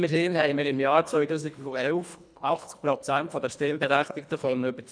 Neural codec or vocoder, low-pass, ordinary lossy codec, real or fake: codec, 24 kHz, 1.5 kbps, HILCodec; 9.9 kHz; none; fake